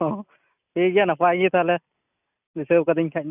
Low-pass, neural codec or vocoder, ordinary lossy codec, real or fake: 3.6 kHz; none; none; real